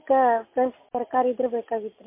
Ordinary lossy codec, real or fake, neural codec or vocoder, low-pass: MP3, 24 kbps; real; none; 3.6 kHz